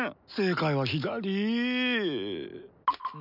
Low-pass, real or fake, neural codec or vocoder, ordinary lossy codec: 5.4 kHz; real; none; none